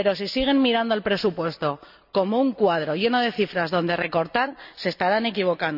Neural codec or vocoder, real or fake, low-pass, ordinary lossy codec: none; real; 5.4 kHz; none